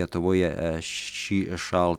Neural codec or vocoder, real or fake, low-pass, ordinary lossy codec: none; real; 19.8 kHz; Opus, 64 kbps